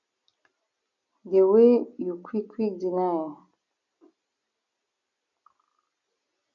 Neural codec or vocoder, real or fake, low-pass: none; real; 7.2 kHz